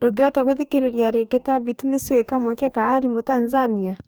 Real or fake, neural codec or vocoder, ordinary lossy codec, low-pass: fake; codec, 44.1 kHz, 2.6 kbps, DAC; none; none